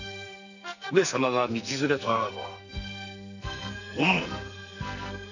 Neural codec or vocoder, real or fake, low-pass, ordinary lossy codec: codec, 44.1 kHz, 2.6 kbps, SNAC; fake; 7.2 kHz; none